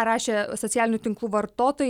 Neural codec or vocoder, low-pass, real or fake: none; 19.8 kHz; real